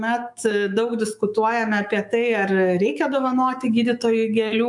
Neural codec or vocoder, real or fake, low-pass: autoencoder, 48 kHz, 128 numbers a frame, DAC-VAE, trained on Japanese speech; fake; 10.8 kHz